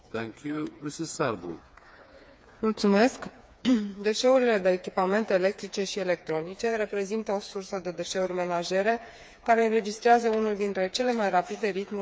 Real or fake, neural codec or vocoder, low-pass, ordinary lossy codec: fake; codec, 16 kHz, 4 kbps, FreqCodec, smaller model; none; none